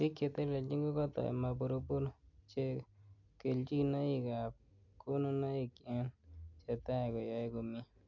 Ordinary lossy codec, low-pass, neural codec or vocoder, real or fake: none; 7.2 kHz; none; real